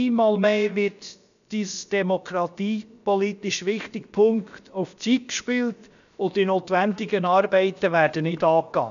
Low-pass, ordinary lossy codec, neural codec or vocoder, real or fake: 7.2 kHz; none; codec, 16 kHz, about 1 kbps, DyCAST, with the encoder's durations; fake